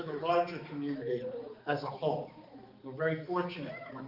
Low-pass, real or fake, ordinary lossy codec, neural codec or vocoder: 5.4 kHz; fake; Opus, 32 kbps; codec, 16 kHz, 4 kbps, X-Codec, HuBERT features, trained on balanced general audio